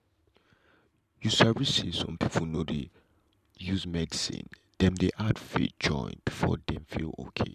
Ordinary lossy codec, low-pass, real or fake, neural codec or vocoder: none; 14.4 kHz; fake; vocoder, 44.1 kHz, 128 mel bands every 512 samples, BigVGAN v2